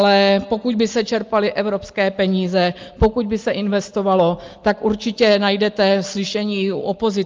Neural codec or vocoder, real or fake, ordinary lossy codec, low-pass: none; real; Opus, 32 kbps; 7.2 kHz